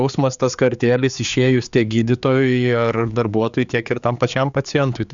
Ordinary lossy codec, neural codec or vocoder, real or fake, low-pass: Opus, 64 kbps; codec, 16 kHz, 4 kbps, X-Codec, HuBERT features, trained on general audio; fake; 7.2 kHz